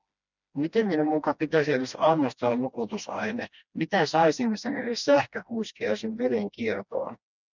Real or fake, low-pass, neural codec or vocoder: fake; 7.2 kHz; codec, 16 kHz, 1 kbps, FreqCodec, smaller model